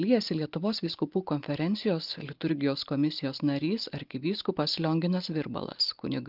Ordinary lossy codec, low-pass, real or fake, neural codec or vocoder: Opus, 32 kbps; 5.4 kHz; real; none